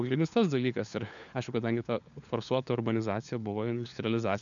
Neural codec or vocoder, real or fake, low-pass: codec, 16 kHz, 4 kbps, FunCodec, trained on LibriTTS, 50 frames a second; fake; 7.2 kHz